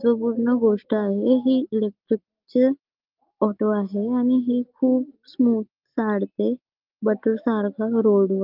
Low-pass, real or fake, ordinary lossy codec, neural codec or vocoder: 5.4 kHz; real; Opus, 32 kbps; none